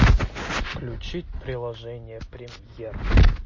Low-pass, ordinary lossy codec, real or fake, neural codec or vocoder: 7.2 kHz; MP3, 32 kbps; real; none